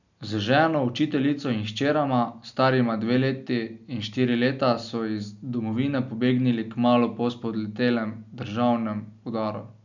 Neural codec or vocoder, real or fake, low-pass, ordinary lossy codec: none; real; 7.2 kHz; none